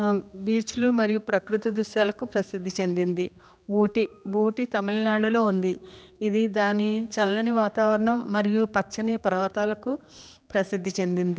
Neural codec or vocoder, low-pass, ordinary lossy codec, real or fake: codec, 16 kHz, 2 kbps, X-Codec, HuBERT features, trained on general audio; none; none; fake